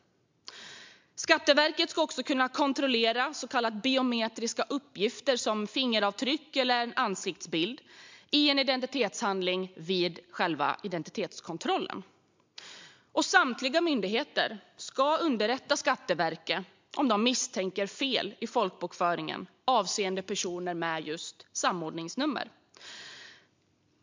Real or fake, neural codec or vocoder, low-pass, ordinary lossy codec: real; none; 7.2 kHz; MP3, 64 kbps